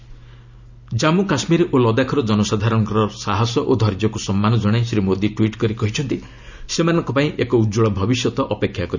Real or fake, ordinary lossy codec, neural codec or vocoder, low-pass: real; none; none; 7.2 kHz